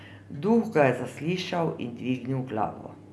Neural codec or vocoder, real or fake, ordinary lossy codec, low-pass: none; real; none; none